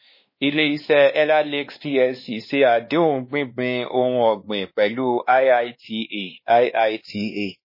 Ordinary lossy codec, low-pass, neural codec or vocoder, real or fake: MP3, 24 kbps; 5.4 kHz; codec, 16 kHz, 2 kbps, X-Codec, WavLM features, trained on Multilingual LibriSpeech; fake